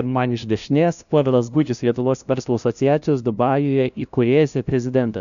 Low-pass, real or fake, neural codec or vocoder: 7.2 kHz; fake; codec, 16 kHz, 0.5 kbps, FunCodec, trained on LibriTTS, 25 frames a second